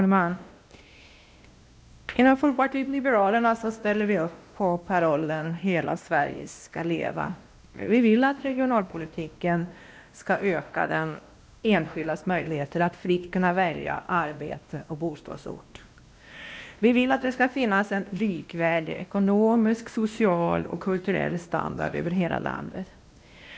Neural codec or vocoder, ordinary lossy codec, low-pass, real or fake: codec, 16 kHz, 1 kbps, X-Codec, WavLM features, trained on Multilingual LibriSpeech; none; none; fake